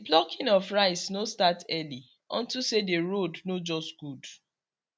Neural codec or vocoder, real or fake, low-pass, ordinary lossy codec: none; real; none; none